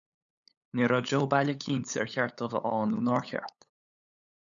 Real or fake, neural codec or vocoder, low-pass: fake; codec, 16 kHz, 8 kbps, FunCodec, trained on LibriTTS, 25 frames a second; 7.2 kHz